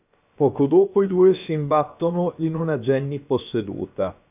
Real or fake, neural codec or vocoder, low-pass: fake; codec, 16 kHz, about 1 kbps, DyCAST, with the encoder's durations; 3.6 kHz